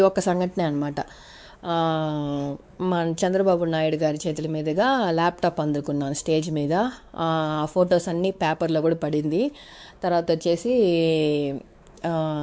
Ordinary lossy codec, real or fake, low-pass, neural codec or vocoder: none; fake; none; codec, 16 kHz, 4 kbps, X-Codec, WavLM features, trained on Multilingual LibriSpeech